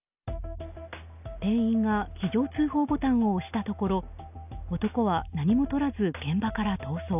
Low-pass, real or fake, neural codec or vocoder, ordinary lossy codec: 3.6 kHz; real; none; none